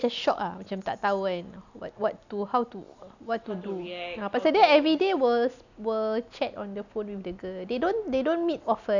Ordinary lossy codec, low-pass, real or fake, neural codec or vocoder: none; 7.2 kHz; real; none